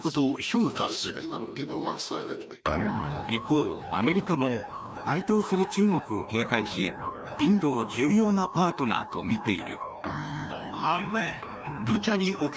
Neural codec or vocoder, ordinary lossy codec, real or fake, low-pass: codec, 16 kHz, 1 kbps, FreqCodec, larger model; none; fake; none